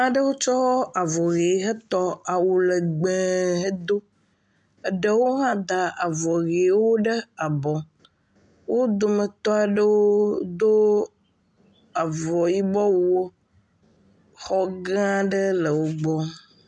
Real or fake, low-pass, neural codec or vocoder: real; 10.8 kHz; none